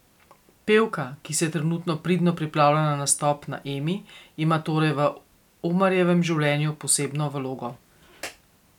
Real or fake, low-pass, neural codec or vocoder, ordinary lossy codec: real; 19.8 kHz; none; none